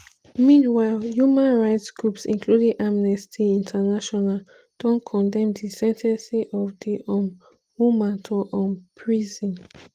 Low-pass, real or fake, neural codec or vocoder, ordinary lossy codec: 14.4 kHz; real; none; Opus, 24 kbps